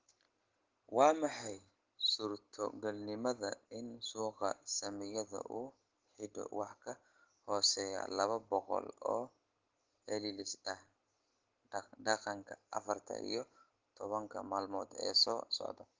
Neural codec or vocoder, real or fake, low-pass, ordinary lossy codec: none; real; 7.2 kHz; Opus, 16 kbps